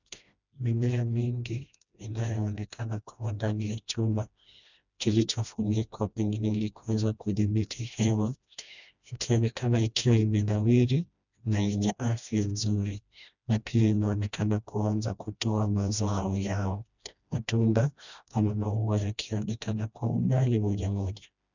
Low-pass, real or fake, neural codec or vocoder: 7.2 kHz; fake; codec, 16 kHz, 1 kbps, FreqCodec, smaller model